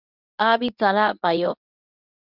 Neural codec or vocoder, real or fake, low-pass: codec, 24 kHz, 0.9 kbps, WavTokenizer, medium speech release version 2; fake; 5.4 kHz